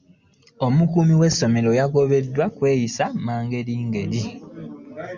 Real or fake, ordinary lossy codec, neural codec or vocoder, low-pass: real; Opus, 64 kbps; none; 7.2 kHz